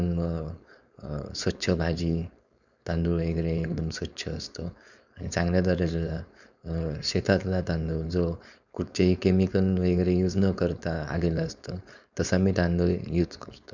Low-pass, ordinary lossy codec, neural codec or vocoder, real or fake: 7.2 kHz; none; codec, 16 kHz, 4.8 kbps, FACodec; fake